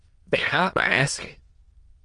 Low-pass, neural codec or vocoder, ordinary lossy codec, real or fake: 9.9 kHz; autoencoder, 22.05 kHz, a latent of 192 numbers a frame, VITS, trained on many speakers; Opus, 24 kbps; fake